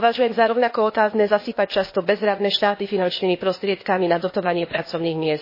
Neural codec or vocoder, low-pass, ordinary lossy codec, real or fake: codec, 16 kHz, 0.8 kbps, ZipCodec; 5.4 kHz; MP3, 24 kbps; fake